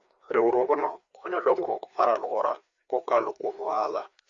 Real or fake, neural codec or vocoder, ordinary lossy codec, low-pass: fake; codec, 16 kHz, 2 kbps, FreqCodec, larger model; Opus, 64 kbps; 7.2 kHz